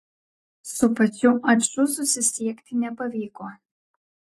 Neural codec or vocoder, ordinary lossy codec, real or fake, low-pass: none; AAC, 48 kbps; real; 14.4 kHz